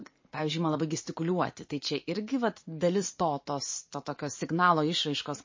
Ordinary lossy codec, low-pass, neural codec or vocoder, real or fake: MP3, 32 kbps; 7.2 kHz; vocoder, 44.1 kHz, 80 mel bands, Vocos; fake